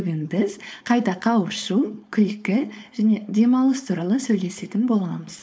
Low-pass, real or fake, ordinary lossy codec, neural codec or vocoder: none; fake; none; codec, 16 kHz, 4.8 kbps, FACodec